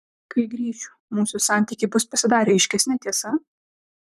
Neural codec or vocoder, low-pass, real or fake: vocoder, 44.1 kHz, 128 mel bands, Pupu-Vocoder; 14.4 kHz; fake